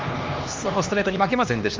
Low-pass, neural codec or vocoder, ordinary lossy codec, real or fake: 7.2 kHz; codec, 16 kHz, 2 kbps, X-Codec, HuBERT features, trained on LibriSpeech; Opus, 32 kbps; fake